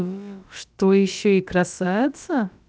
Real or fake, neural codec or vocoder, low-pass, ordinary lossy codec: fake; codec, 16 kHz, about 1 kbps, DyCAST, with the encoder's durations; none; none